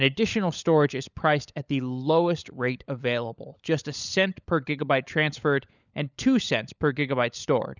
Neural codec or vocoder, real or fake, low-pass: codec, 16 kHz, 16 kbps, FunCodec, trained on LibriTTS, 50 frames a second; fake; 7.2 kHz